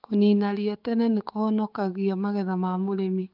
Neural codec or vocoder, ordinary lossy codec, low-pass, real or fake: codec, 16 kHz, 6 kbps, DAC; Opus, 32 kbps; 5.4 kHz; fake